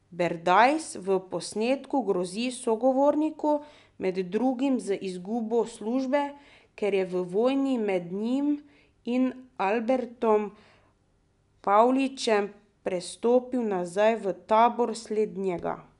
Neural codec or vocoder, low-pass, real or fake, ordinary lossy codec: none; 10.8 kHz; real; none